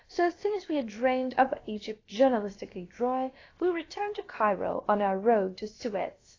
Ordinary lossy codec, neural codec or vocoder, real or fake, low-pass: AAC, 32 kbps; codec, 16 kHz, about 1 kbps, DyCAST, with the encoder's durations; fake; 7.2 kHz